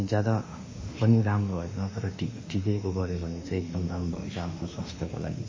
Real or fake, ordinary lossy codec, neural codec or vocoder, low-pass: fake; MP3, 32 kbps; autoencoder, 48 kHz, 32 numbers a frame, DAC-VAE, trained on Japanese speech; 7.2 kHz